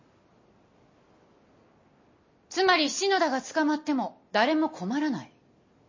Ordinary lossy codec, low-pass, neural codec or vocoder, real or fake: MP3, 32 kbps; 7.2 kHz; none; real